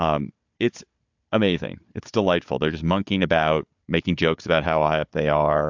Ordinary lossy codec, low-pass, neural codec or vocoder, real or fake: MP3, 64 kbps; 7.2 kHz; codec, 16 kHz, 4 kbps, FunCodec, trained on Chinese and English, 50 frames a second; fake